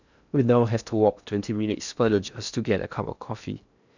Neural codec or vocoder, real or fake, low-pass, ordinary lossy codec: codec, 16 kHz in and 24 kHz out, 0.6 kbps, FocalCodec, streaming, 4096 codes; fake; 7.2 kHz; none